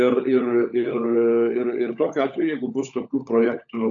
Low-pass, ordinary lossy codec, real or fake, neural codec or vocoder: 7.2 kHz; MP3, 64 kbps; fake; codec, 16 kHz, 16 kbps, FunCodec, trained on LibriTTS, 50 frames a second